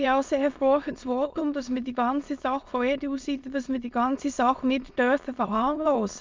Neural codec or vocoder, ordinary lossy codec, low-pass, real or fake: autoencoder, 22.05 kHz, a latent of 192 numbers a frame, VITS, trained on many speakers; Opus, 24 kbps; 7.2 kHz; fake